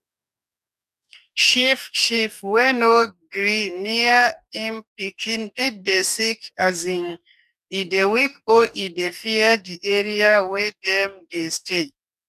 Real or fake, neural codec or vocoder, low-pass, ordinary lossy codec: fake; codec, 44.1 kHz, 2.6 kbps, DAC; 14.4 kHz; none